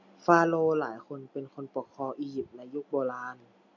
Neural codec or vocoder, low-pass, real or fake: none; 7.2 kHz; real